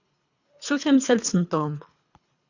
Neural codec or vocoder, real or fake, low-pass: codec, 24 kHz, 3 kbps, HILCodec; fake; 7.2 kHz